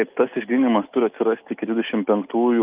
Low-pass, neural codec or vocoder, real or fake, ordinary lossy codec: 3.6 kHz; none; real; Opus, 24 kbps